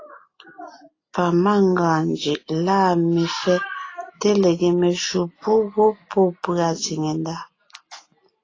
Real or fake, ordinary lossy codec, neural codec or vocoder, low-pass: real; AAC, 32 kbps; none; 7.2 kHz